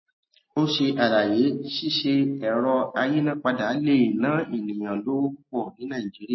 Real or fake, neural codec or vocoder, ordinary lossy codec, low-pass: real; none; MP3, 24 kbps; 7.2 kHz